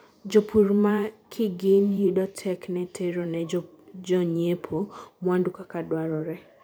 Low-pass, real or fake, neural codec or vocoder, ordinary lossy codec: none; fake; vocoder, 44.1 kHz, 128 mel bands every 512 samples, BigVGAN v2; none